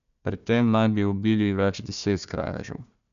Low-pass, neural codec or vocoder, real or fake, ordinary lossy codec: 7.2 kHz; codec, 16 kHz, 1 kbps, FunCodec, trained on Chinese and English, 50 frames a second; fake; none